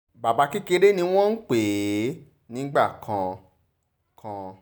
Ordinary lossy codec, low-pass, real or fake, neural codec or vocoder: none; none; real; none